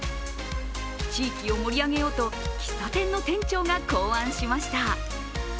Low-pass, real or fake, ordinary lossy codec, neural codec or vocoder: none; real; none; none